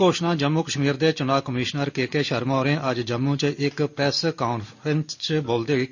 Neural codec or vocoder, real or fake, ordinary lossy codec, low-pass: none; real; none; 7.2 kHz